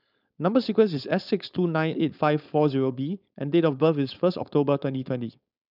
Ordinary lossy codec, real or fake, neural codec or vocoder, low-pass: none; fake; codec, 16 kHz, 4.8 kbps, FACodec; 5.4 kHz